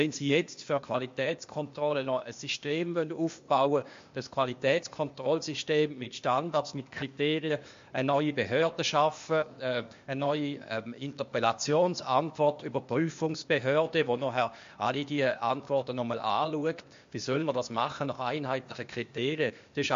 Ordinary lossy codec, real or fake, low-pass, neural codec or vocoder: MP3, 48 kbps; fake; 7.2 kHz; codec, 16 kHz, 0.8 kbps, ZipCodec